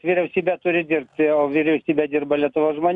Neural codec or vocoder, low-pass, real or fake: none; 10.8 kHz; real